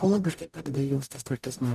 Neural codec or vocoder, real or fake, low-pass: codec, 44.1 kHz, 0.9 kbps, DAC; fake; 14.4 kHz